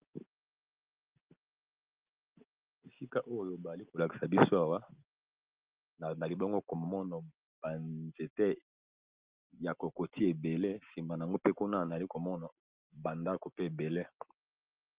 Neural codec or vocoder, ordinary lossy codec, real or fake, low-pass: none; Opus, 32 kbps; real; 3.6 kHz